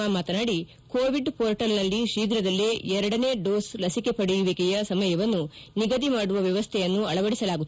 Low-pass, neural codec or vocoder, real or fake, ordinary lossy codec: none; none; real; none